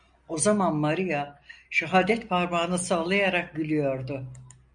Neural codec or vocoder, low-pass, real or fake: none; 9.9 kHz; real